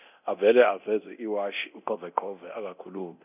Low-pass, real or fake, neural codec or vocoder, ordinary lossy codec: 3.6 kHz; fake; codec, 24 kHz, 0.9 kbps, DualCodec; none